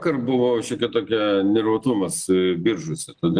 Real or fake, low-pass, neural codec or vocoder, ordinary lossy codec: real; 9.9 kHz; none; Opus, 24 kbps